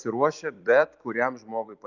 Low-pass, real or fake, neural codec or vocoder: 7.2 kHz; fake; vocoder, 44.1 kHz, 128 mel bands every 256 samples, BigVGAN v2